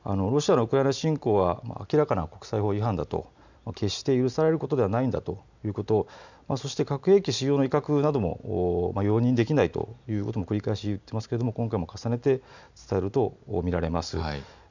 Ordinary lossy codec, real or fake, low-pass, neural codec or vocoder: none; real; 7.2 kHz; none